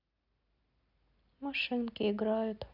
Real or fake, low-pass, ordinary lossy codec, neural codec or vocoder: real; 5.4 kHz; none; none